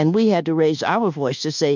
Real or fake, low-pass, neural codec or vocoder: fake; 7.2 kHz; codec, 24 kHz, 1.2 kbps, DualCodec